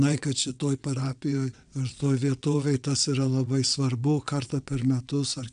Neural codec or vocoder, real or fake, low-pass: vocoder, 22.05 kHz, 80 mel bands, WaveNeXt; fake; 9.9 kHz